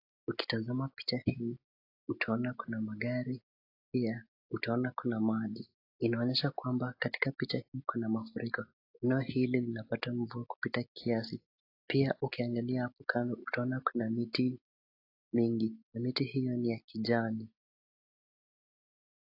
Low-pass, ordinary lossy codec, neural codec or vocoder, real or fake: 5.4 kHz; AAC, 32 kbps; none; real